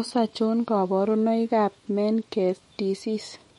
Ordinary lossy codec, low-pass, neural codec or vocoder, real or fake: MP3, 48 kbps; 19.8 kHz; autoencoder, 48 kHz, 128 numbers a frame, DAC-VAE, trained on Japanese speech; fake